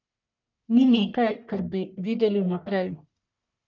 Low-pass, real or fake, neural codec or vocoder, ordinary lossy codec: 7.2 kHz; fake; codec, 44.1 kHz, 1.7 kbps, Pupu-Codec; none